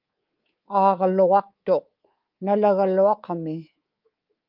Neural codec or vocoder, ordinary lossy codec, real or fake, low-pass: codec, 24 kHz, 3.1 kbps, DualCodec; Opus, 32 kbps; fake; 5.4 kHz